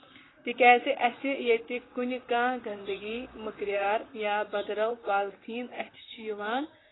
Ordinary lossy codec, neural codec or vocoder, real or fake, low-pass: AAC, 16 kbps; vocoder, 44.1 kHz, 128 mel bands, Pupu-Vocoder; fake; 7.2 kHz